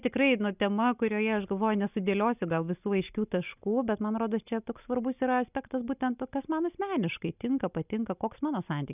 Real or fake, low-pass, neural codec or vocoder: real; 3.6 kHz; none